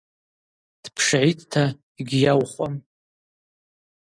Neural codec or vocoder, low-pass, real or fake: none; 9.9 kHz; real